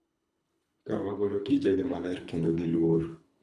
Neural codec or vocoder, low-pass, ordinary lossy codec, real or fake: codec, 24 kHz, 3 kbps, HILCodec; none; none; fake